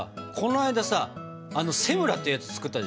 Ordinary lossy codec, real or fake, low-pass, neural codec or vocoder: none; real; none; none